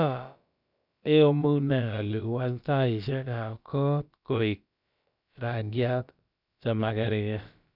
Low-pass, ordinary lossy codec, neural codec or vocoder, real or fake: 5.4 kHz; none; codec, 16 kHz, about 1 kbps, DyCAST, with the encoder's durations; fake